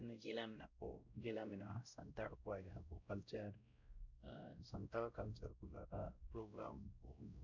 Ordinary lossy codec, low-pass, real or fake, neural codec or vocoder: none; 7.2 kHz; fake; codec, 16 kHz, 0.5 kbps, X-Codec, WavLM features, trained on Multilingual LibriSpeech